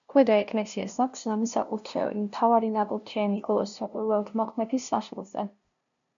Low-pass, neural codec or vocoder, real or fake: 7.2 kHz; codec, 16 kHz, 0.5 kbps, FunCodec, trained on LibriTTS, 25 frames a second; fake